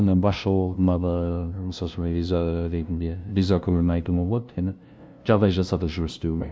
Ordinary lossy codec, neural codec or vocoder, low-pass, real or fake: none; codec, 16 kHz, 0.5 kbps, FunCodec, trained on LibriTTS, 25 frames a second; none; fake